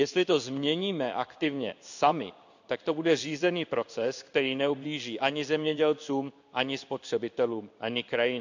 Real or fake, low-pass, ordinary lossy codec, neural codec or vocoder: fake; 7.2 kHz; none; codec, 16 kHz in and 24 kHz out, 1 kbps, XY-Tokenizer